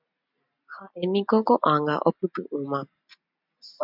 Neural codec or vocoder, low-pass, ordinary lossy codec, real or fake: none; 5.4 kHz; MP3, 48 kbps; real